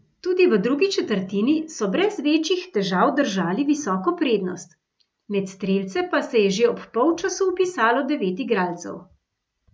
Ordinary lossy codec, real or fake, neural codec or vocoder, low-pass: none; real; none; none